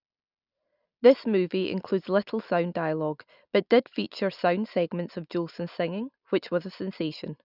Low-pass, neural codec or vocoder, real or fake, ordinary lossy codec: 5.4 kHz; none; real; none